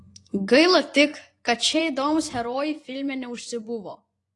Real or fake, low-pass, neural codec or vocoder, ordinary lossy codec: fake; 10.8 kHz; vocoder, 24 kHz, 100 mel bands, Vocos; AAC, 48 kbps